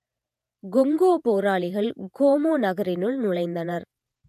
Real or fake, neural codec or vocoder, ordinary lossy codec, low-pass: fake; vocoder, 48 kHz, 128 mel bands, Vocos; none; 14.4 kHz